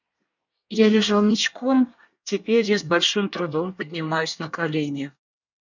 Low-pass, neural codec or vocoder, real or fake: 7.2 kHz; codec, 24 kHz, 1 kbps, SNAC; fake